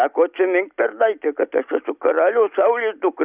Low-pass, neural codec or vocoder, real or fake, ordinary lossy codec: 3.6 kHz; none; real; Opus, 64 kbps